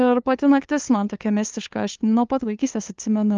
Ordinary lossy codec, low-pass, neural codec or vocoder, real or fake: Opus, 24 kbps; 7.2 kHz; codec, 16 kHz, 2 kbps, FunCodec, trained on LibriTTS, 25 frames a second; fake